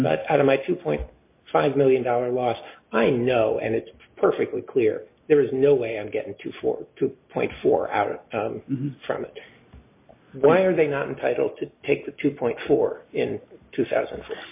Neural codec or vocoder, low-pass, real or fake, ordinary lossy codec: none; 3.6 kHz; real; MP3, 24 kbps